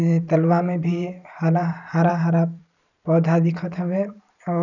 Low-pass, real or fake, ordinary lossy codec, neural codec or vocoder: 7.2 kHz; fake; none; vocoder, 44.1 kHz, 128 mel bands every 512 samples, BigVGAN v2